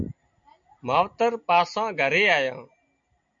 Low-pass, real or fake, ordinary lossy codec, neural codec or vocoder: 7.2 kHz; real; MP3, 64 kbps; none